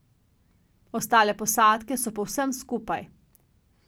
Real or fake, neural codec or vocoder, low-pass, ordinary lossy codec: real; none; none; none